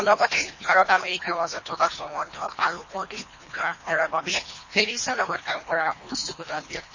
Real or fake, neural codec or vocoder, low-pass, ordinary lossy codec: fake; codec, 24 kHz, 1.5 kbps, HILCodec; 7.2 kHz; MP3, 32 kbps